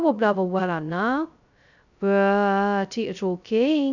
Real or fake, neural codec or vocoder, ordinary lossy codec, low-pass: fake; codec, 16 kHz, 0.2 kbps, FocalCodec; none; 7.2 kHz